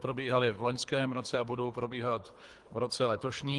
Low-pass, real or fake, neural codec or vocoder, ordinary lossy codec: 10.8 kHz; fake; codec, 24 kHz, 3 kbps, HILCodec; Opus, 24 kbps